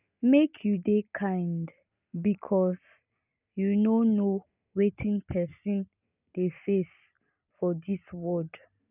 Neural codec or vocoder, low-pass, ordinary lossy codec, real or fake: none; 3.6 kHz; none; real